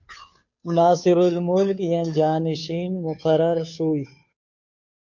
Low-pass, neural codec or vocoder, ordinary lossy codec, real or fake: 7.2 kHz; codec, 16 kHz, 2 kbps, FunCodec, trained on Chinese and English, 25 frames a second; MP3, 48 kbps; fake